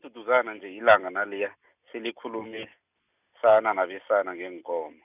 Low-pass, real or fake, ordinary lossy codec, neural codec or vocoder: 3.6 kHz; real; none; none